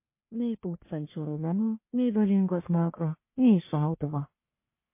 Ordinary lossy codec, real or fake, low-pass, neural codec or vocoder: MP3, 24 kbps; fake; 3.6 kHz; codec, 44.1 kHz, 1.7 kbps, Pupu-Codec